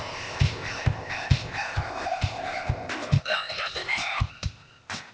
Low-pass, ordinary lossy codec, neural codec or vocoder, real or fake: none; none; codec, 16 kHz, 0.8 kbps, ZipCodec; fake